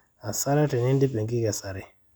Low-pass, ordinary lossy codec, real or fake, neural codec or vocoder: none; none; real; none